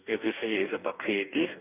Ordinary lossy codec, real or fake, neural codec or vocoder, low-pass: none; fake; codec, 44.1 kHz, 2.6 kbps, DAC; 3.6 kHz